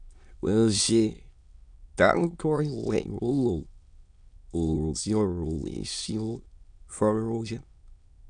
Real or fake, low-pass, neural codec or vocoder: fake; 9.9 kHz; autoencoder, 22.05 kHz, a latent of 192 numbers a frame, VITS, trained on many speakers